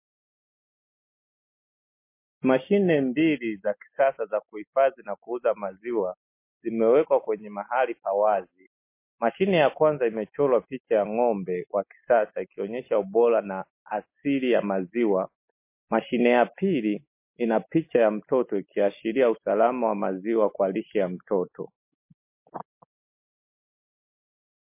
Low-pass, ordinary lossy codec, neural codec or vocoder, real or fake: 3.6 kHz; MP3, 24 kbps; none; real